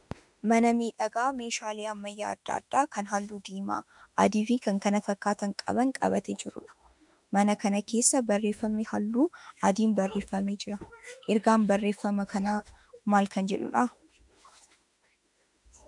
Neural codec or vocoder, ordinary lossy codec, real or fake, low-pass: autoencoder, 48 kHz, 32 numbers a frame, DAC-VAE, trained on Japanese speech; MP3, 96 kbps; fake; 10.8 kHz